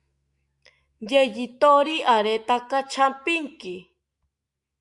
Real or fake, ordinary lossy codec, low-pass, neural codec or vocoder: fake; Opus, 64 kbps; 10.8 kHz; autoencoder, 48 kHz, 128 numbers a frame, DAC-VAE, trained on Japanese speech